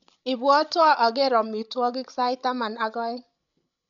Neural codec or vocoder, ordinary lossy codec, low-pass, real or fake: codec, 16 kHz, 16 kbps, FreqCodec, larger model; none; 7.2 kHz; fake